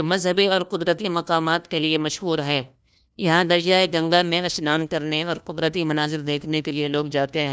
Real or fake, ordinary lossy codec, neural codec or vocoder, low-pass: fake; none; codec, 16 kHz, 1 kbps, FunCodec, trained on LibriTTS, 50 frames a second; none